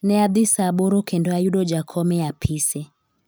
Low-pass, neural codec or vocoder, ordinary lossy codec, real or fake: none; none; none; real